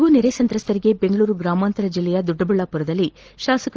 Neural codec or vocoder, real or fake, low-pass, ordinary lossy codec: none; real; 7.2 kHz; Opus, 16 kbps